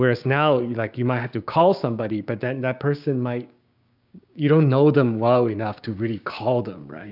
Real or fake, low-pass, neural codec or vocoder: fake; 5.4 kHz; codec, 16 kHz, 6 kbps, DAC